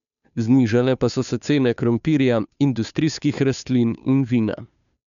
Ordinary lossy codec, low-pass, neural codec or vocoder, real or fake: none; 7.2 kHz; codec, 16 kHz, 2 kbps, FunCodec, trained on Chinese and English, 25 frames a second; fake